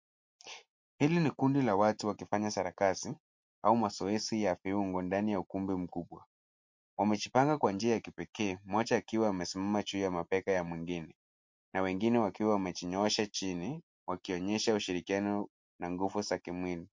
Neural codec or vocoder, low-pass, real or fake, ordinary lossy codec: none; 7.2 kHz; real; MP3, 48 kbps